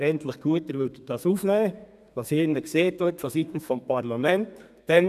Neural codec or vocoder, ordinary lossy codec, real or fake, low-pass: codec, 32 kHz, 1.9 kbps, SNAC; none; fake; 14.4 kHz